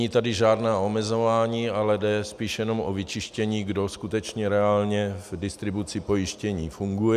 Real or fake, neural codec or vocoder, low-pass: real; none; 14.4 kHz